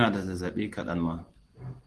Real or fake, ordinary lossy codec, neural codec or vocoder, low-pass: real; Opus, 16 kbps; none; 10.8 kHz